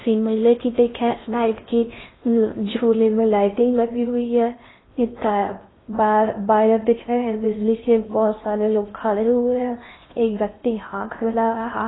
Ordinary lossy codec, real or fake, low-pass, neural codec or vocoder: AAC, 16 kbps; fake; 7.2 kHz; codec, 16 kHz in and 24 kHz out, 0.6 kbps, FocalCodec, streaming, 4096 codes